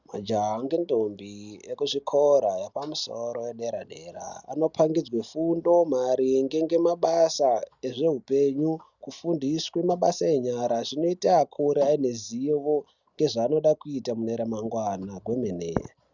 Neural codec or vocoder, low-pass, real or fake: none; 7.2 kHz; real